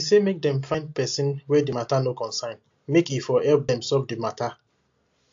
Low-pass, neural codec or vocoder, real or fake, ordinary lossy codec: 7.2 kHz; none; real; AAC, 48 kbps